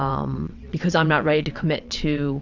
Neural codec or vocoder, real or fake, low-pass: vocoder, 22.05 kHz, 80 mel bands, WaveNeXt; fake; 7.2 kHz